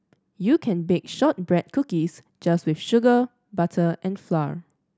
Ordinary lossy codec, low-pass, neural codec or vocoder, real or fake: none; none; none; real